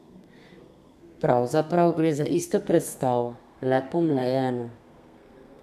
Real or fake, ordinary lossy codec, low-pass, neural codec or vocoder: fake; none; 14.4 kHz; codec, 32 kHz, 1.9 kbps, SNAC